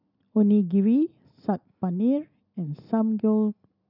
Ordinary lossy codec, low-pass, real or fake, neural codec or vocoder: none; 5.4 kHz; real; none